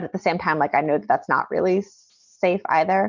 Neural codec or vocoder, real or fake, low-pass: none; real; 7.2 kHz